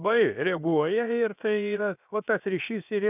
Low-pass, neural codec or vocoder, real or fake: 3.6 kHz; codec, 16 kHz, 0.7 kbps, FocalCodec; fake